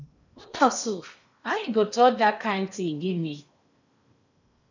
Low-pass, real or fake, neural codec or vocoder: 7.2 kHz; fake; codec, 16 kHz in and 24 kHz out, 0.8 kbps, FocalCodec, streaming, 65536 codes